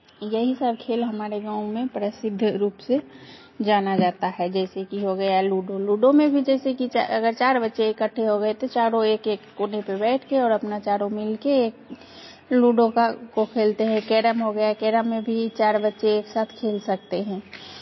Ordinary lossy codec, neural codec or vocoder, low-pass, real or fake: MP3, 24 kbps; none; 7.2 kHz; real